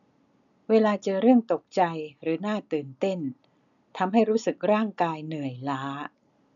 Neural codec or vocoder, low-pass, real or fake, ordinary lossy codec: none; 7.2 kHz; real; none